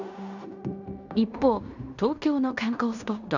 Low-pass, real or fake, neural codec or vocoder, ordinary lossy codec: 7.2 kHz; fake; codec, 16 kHz in and 24 kHz out, 0.9 kbps, LongCat-Audio-Codec, fine tuned four codebook decoder; none